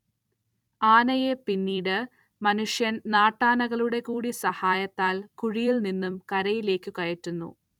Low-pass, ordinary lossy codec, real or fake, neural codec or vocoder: 19.8 kHz; none; fake; vocoder, 44.1 kHz, 128 mel bands every 256 samples, BigVGAN v2